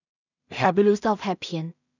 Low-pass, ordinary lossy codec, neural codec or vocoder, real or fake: 7.2 kHz; none; codec, 16 kHz in and 24 kHz out, 0.4 kbps, LongCat-Audio-Codec, two codebook decoder; fake